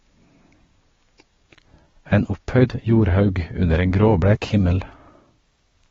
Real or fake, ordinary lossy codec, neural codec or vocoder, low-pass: fake; AAC, 24 kbps; codec, 16 kHz, 6 kbps, DAC; 7.2 kHz